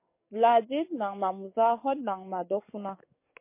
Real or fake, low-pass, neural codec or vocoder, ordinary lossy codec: fake; 3.6 kHz; codec, 44.1 kHz, 7.8 kbps, DAC; MP3, 24 kbps